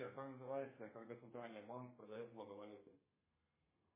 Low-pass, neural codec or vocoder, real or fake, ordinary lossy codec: 3.6 kHz; codec, 32 kHz, 1.9 kbps, SNAC; fake; MP3, 16 kbps